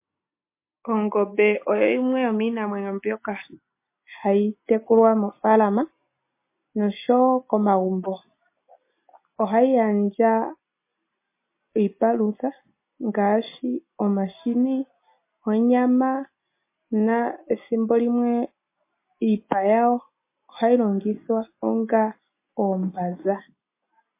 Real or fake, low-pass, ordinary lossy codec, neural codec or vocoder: real; 3.6 kHz; MP3, 24 kbps; none